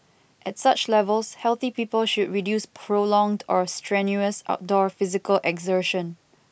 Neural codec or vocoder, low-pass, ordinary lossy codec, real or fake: none; none; none; real